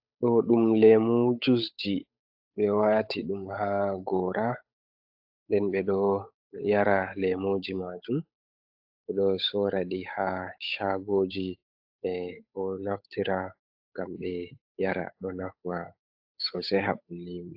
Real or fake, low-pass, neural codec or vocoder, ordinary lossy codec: fake; 5.4 kHz; codec, 16 kHz, 8 kbps, FunCodec, trained on Chinese and English, 25 frames a second; AAC, 48 kbps